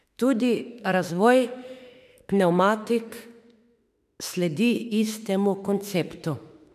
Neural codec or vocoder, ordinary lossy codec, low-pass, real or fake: autoencoder, 48 kHz, 32 numbers a frame, DAC-VAE, trained on Japanese speech; none; 14.4 kHz; fake